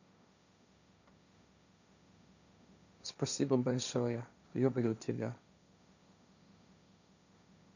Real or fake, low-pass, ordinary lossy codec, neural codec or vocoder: fake; 7.2 kHz; none; codec, 16 kHz, 1.1 kbps, Voila-Tokenizer